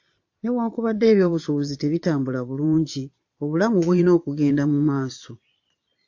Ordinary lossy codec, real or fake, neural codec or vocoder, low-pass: AAC, 48 kbps; fake; vocoder, 22.05 kHz, 80 mel bands, Vocos; 7.2 kHz